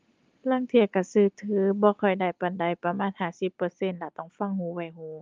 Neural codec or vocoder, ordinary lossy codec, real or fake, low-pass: none; Opus, 32 kbps; real; 7.2 kHz